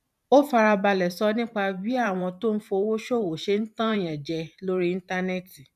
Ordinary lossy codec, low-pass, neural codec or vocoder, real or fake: none; 14.4 kHz; vocoder, 44.1 kHz, 128 mel bands every 512 samples, BigVGAN v2; fake